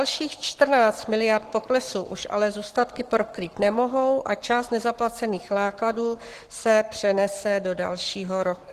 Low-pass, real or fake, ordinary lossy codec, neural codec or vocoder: 14.4 kHz; fake; Opus, 16 kbps; codec, 44.1 kHz, 7.8 kbps, Pupu-Codec